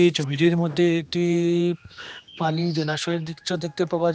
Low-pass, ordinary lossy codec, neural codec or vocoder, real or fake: none; none; codec, 16 kHz, 2 kbps, X-Codec, HuBERT features, trained on general audio; fake